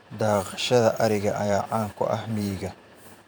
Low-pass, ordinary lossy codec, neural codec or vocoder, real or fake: none; none; vocoder, 44.1 kHz, 128 mel bands every 512 samples, BigVGAN v2; fake